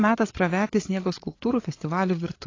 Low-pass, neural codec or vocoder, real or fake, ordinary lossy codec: 7.2 kHz; codec, 16 kHz, 8 kbps, FreqCodec, larger model; fake; AAC, 32 kbps